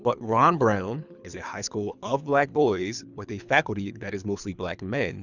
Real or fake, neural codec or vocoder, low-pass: fake; codec, 24 kHz, 3 kbps, HILCodec; 7.2 kHz